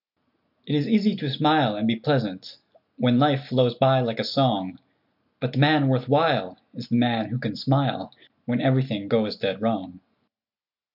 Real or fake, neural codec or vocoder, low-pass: real; none; 5.4 kHz